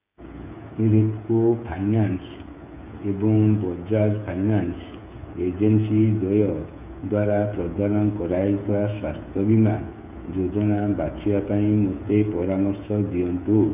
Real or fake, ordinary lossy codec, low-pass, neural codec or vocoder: fake; none; 3.6 kHz; codec, 16 kHz, 8 kbps, FreqCodec, smaller model